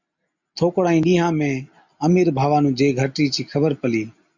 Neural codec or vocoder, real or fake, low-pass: none; real; 7.2 kHz